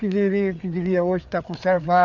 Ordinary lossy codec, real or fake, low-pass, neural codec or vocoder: none; fake; 7.2 kHz; codec, 16 kHz, 4 kbps, FreqCodec, larger model